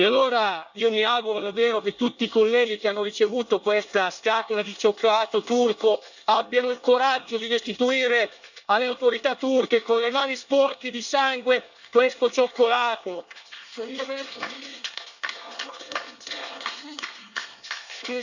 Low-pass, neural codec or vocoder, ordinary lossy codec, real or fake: 7.2 kHz; codec, 24 kHz, 1 kbps, SNAC; none; fake